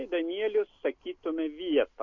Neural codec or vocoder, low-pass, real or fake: none; 7.2 kHz; real